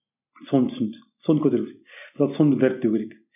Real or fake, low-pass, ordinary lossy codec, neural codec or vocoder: real; 3.6 kHz; none; none